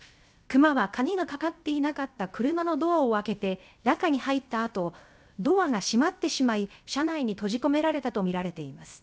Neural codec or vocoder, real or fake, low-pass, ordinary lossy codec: codec, 16 kHz, 0.7 kbps, FocalCodec; fake; none; none